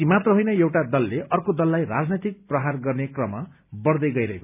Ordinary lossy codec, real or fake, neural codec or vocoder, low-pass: none; real; none; 3.6 kHz